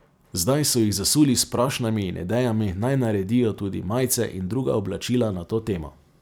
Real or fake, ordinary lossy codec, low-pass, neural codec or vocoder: fake; none; none; vocoder, 44.1 kHz, 128 mel bands every 256 samples, BigVGAN v2